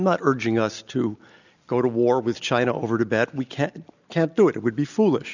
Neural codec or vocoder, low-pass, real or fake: none; 7.2 kHz; real